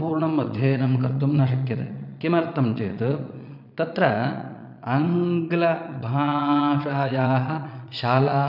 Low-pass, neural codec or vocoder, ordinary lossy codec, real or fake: 5.4 kHz; vocoder, 22.05 kHz, 80 mel bands, Vocos; none; fake